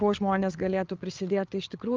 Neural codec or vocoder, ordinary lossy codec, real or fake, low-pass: codec, 16 kHz, 8 kbps, FreqCodec, larger model; Opus, 24 kbps; fake; 7.2 kHz